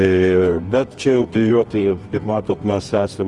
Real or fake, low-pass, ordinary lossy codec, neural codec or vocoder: fake; 10.8 kHz; Opus, 24 kbps; codec, 24 kHz, 0.9 kbps, WavTokenizer, medium music audio release